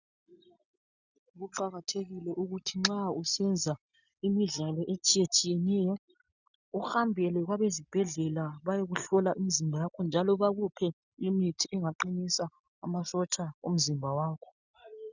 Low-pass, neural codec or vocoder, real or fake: 7.2 kHz; none; real